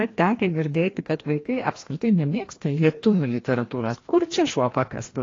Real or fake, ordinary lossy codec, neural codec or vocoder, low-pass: fake; AAC, 32 kbps; codec, 16 kHz, 1 kbps, FreqCodec, larger model; 7.2 kHz